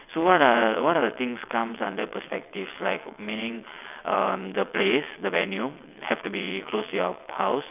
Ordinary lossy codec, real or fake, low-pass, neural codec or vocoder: none; fake; 3.6 kHz; vocoder, 22.05 kHz, 80 mel bands, WaveNeXt